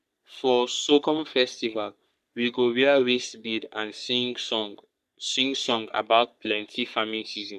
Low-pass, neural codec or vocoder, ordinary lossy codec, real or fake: 14.4 kHz; codec, 44.1 kHz, 3.4 kbps, Pupu-Codec; none; fake